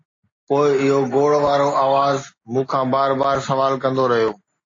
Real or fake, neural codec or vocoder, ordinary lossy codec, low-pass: real; none; AAC, 32 kbps; 7.2 kHz